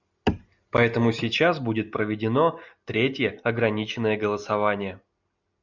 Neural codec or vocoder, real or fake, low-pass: none; real; 7.2 kHz